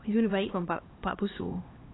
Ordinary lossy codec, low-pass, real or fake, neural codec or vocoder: AAC, 16 kbps; 7.2 kHz; fake; codec, 16 kHz, 2 kbps, X-Codec, HuBERT features, trained on LibriSpeech